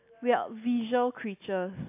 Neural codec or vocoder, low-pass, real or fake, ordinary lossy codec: none; 3.6 kHz; real; AAC, 32 kbps